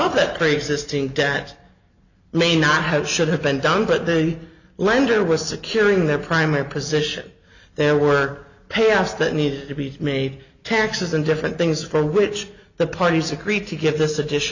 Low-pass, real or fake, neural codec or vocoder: 7.2 kHz; real; none